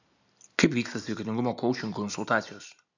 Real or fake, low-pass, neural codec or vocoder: real; 7.2 kHz; none